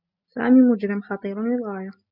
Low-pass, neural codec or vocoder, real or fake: 5.4 kHz; none; real